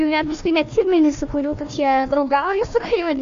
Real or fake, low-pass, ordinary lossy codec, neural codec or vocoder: fake; 7.2 kHz; AAC, 64 kbps; codec, 16 kHz, 1 kbps, FunCodec, trained on Chinese and English, 50 frames a second